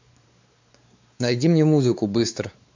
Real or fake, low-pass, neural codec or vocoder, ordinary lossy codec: fake; 7.2 kHz; codec, 16 kHz, 4 kbps, X-Codec, WavLM features, trained on Multilingual LibriSpeech; AAC, 48 kbps